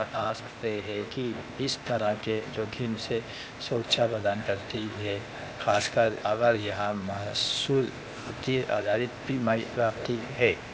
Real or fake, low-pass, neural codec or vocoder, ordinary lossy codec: fake; none; codec, 16 kHz, 0.8 kbps, ZipCodec; none